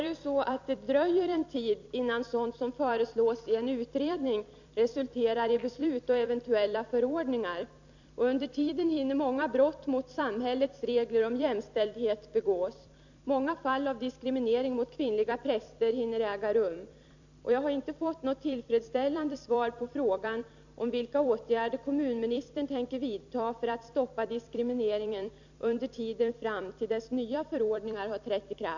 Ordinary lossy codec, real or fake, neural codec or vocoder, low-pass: none; fake; vocoder, 44.1 kHz, 128 mel bands every 256 samples, BigVGAN v2; 7.2 kHz